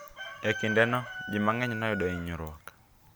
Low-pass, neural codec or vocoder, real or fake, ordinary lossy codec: none; none; real; none